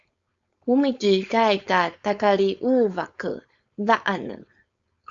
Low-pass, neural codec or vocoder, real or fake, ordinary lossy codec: 7.2 kHz; codec, 16 kHz, 4.8 kbps, FACodec; fake; Opus, 64 kbps